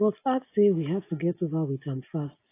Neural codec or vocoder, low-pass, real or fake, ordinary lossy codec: none; 3.6 kHz; real; AAC, 24 kbps